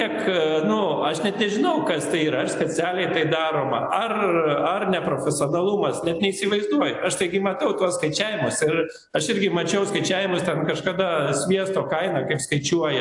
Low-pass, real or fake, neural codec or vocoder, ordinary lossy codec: 10.8 kHz; real; none; AAC, 64 kbps